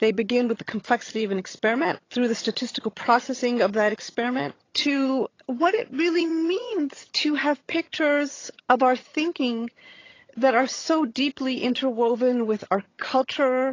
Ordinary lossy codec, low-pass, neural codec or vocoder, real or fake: AAC, 32 kbps; 7.2 kHz; vocoder, 22.05 kHz, 80 mel bands, HiFi-GAN; fake